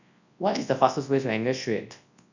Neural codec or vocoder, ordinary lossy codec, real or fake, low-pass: codec, 24 kHz, 0.9 kbps, WavTokenizer, large speech release; none; fake; 7.2 kHz